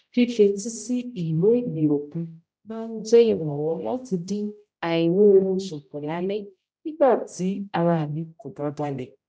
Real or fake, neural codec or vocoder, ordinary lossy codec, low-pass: fake; codec, 16 kHz, 0.5 kbps, X-Codec, HuBERT features, trained on general audio; none; none